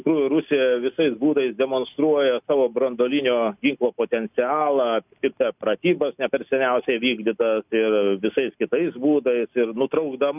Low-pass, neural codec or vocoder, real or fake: 3.6 kHz; none; real